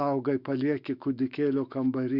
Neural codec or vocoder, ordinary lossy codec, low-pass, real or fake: autoencoder, 48 kHz, 128 numbers a frame, DAC-VAE, trained on Japanese speech; Opus, 64 kbps; 5.4 kHz; fake